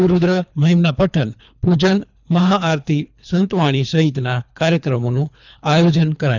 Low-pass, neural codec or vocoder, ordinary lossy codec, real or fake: 7.2 kHz; codec, 24 kHz, 3 kbps, HILCodec; none; fake